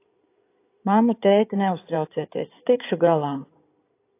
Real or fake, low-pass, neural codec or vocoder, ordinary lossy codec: fake; 3.6 kHz; codec, 16 kHz in and 24 kHz out, 2.2 kbps, FireRedTTS-2 codec; AAC, 24 kbps